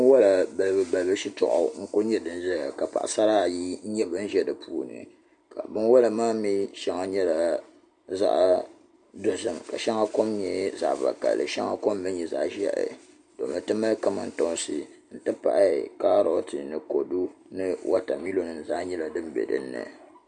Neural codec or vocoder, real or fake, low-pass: none; real; 9.9 kHz